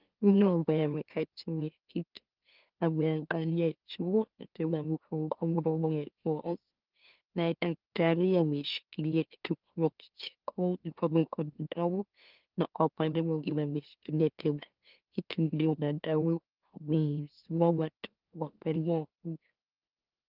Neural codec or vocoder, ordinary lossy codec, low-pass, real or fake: autoencoder, 44.1 kHz, a latent of 192 numbers a frame, MeloTTS; Opus, 32 kbps; 5.4 kHz; fake